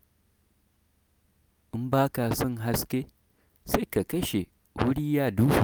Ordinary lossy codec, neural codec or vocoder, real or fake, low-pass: none; none; real; none